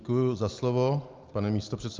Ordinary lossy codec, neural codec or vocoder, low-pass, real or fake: Opus, 32 kbps; none; 7.2 kHz; real